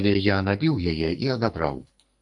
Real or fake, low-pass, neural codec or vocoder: fake; 10.8 kHz; codec, 44.1 kHz, 2.6 kbps, SNAC